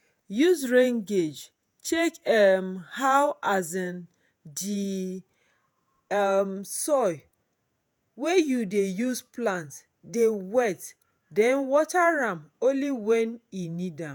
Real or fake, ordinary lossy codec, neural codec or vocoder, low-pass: fake; none; vocoder, 48 kHz, 128 mel bands, Vocos; none